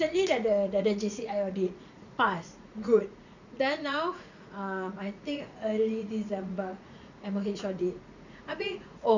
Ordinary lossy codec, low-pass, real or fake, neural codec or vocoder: none; 7.2 kHz; fake; vocoder, 44.1 kHz, 80 mel bands, Vocos